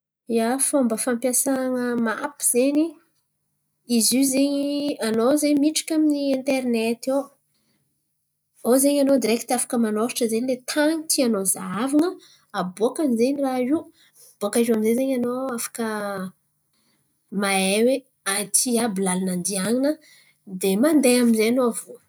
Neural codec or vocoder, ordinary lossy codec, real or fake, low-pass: none; none; real; none